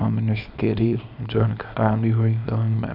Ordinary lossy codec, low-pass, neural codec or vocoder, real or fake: none; 5.4 kHz; codec, 24 kHz, 0.9 kbps, WavTokenizer, small release; fake